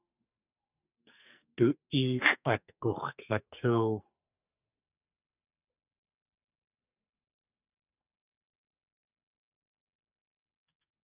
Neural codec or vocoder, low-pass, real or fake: codec, 44.1 kHz, 2.6 kbps, SNAC; 3.6 kHz; fake